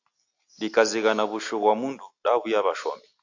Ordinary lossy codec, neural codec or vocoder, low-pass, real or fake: AAC, 48 kbps; none; 7.2 kHz; real